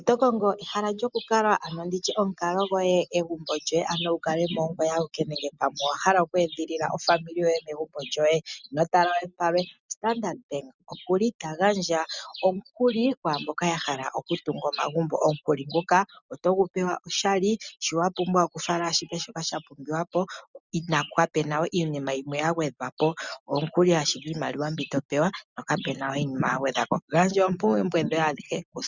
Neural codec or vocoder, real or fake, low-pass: none; real; 7.2 kHz